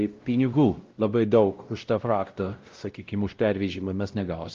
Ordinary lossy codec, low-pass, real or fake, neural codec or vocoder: Opus, 24 kbps; 7.2 kHz; fake; codec, 16 kHz, 0.5 kbps, X-Codec, WavLM features, trained on Multilingual LibriSpeech